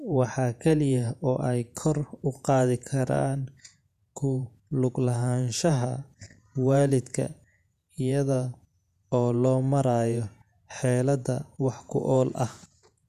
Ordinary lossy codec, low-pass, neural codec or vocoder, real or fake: none; 14.4 kHz; vocoder, 48 kHz, 128 mel bands, Vocos; fake